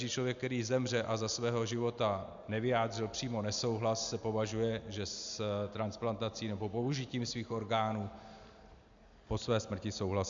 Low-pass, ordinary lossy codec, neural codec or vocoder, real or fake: 7.2 kHz; MP3, 64 kbps; none; real